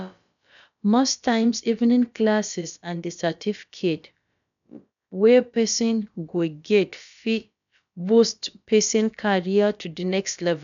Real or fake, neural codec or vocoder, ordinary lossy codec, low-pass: fake; codec, 16 kHz, about 1 kbps, DyCAST, with the encoder's durations; none; 7.2 kHz